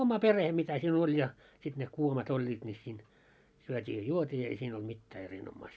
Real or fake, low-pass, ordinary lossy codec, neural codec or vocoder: real; none; none; none